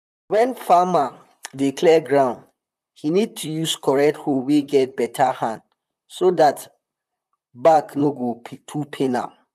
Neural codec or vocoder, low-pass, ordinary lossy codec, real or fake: vocoder, 44.1 kHz, 128 mel bands, Pupu-Vocoder; 14.4 kHz; none; fake